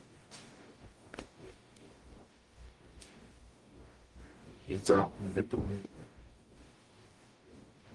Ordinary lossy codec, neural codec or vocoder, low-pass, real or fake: Opus, 24 kbps; codec, 44.1 kHz, 0.9 kbps, DAC; 10.8 kHz; fake